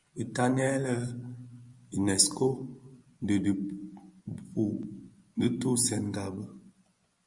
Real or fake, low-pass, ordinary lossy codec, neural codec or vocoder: fake; 10.8 kHz; Opus, 64 kbps; vocoder, 24 kHz, 100 mel bands, Vocos